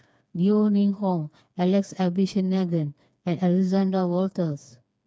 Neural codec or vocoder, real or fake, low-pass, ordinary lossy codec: codec, 16 kHz, 4 kbps, FreqCodec, smaller model; fake; none; none